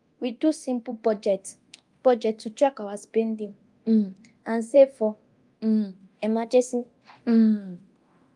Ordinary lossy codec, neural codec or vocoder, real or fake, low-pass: Opus, 32 kbps; codec, 24 kHz, 0.9 kbps, DualCodec; fake; 10.8 kHz